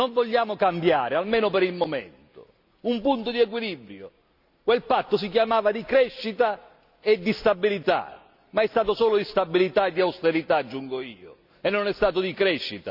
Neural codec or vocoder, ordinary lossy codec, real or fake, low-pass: none; none; real; 5.4 kHz